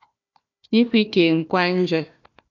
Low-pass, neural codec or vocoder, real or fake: 7.2 kHz; codec, 16 kHz, 1 kbps, FunCodec, trained on Chinese and English, 50 frames a second; fake